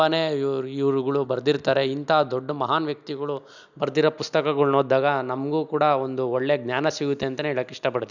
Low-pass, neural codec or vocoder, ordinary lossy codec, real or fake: 7.2 kHz; none; none; real